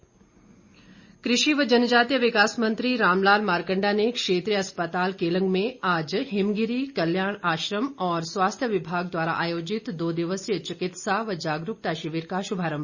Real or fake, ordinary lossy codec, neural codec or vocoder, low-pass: real; Opus, 64 kbps; none; 7.2 kHz